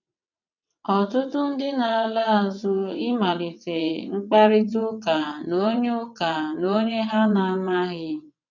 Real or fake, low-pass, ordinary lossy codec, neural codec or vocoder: fake; 7.2 kHz; none; vocoder, 22.05 kHz, 80 mel bands, WaveNeXt